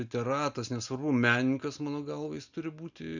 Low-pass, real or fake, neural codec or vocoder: 7.2 kHz; real; none